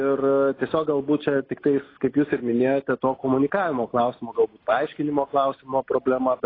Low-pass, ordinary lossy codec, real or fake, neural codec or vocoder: 5.4 kHz; AAC, 24 kbps; real; none